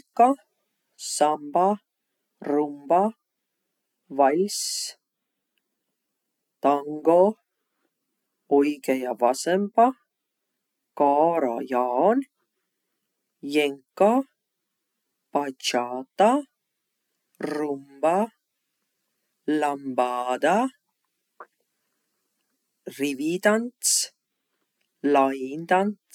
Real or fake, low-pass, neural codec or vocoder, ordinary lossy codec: real; 19.8 kHz; none; none